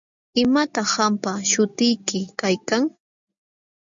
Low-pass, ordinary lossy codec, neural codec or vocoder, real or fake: 7.2 kHz; MP3, 64 kbps; none; real